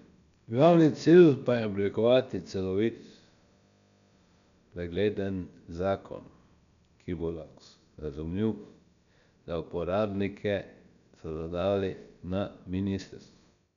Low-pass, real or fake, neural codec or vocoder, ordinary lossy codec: 7.2 kHz; fake; codec, 16 kHz, about 1 kbps, DyCAST, with the encoder's durations; none